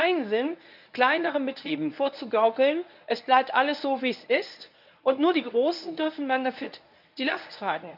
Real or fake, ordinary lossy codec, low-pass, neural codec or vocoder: fake; none; 5.4 kHz; codec, 24 kHz, 0.9 kbps, WavTokenizer, medium speech release version 1